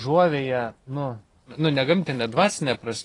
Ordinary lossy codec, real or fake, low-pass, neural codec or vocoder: AAC, 32 kbps; fake; 10.8 kHz; vocoder, 44.1 kHz, 128 mel bands every 256 samples, BigVGAN v2